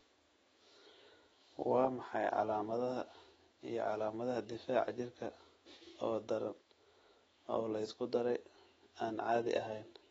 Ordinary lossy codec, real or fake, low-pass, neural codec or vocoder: AAC, 24 kbps; fake; 19.8 kHz; autoencoder, 48 kHz, 128 numbers a frame, DAC-VAE, trained on Japanese speech